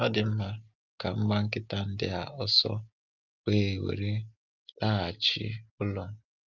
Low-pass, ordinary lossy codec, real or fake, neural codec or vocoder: 7.2 kHz; Opus, 32 kbps; real; none